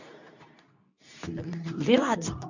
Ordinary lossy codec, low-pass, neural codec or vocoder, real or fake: none; 7.2 kHz; codec, 24 kHz, 0.9 kbps, WavTokenizer, medium speech release version 2; fake